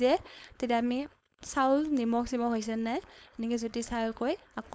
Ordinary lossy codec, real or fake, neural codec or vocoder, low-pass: none; fake; codec, 16 kHz, 4.8 kbps, FACodec; none